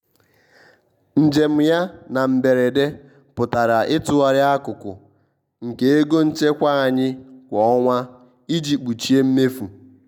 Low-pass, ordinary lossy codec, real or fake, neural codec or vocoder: 19.8 kHz; none; real; none